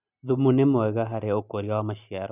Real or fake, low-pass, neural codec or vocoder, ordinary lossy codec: real; 3.6 kHz; none; none